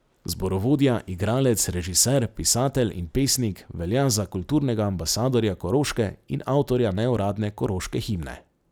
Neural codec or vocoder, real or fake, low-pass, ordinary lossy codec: none; real; none; none